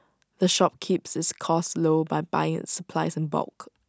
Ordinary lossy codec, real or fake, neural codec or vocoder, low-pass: none; real; none; none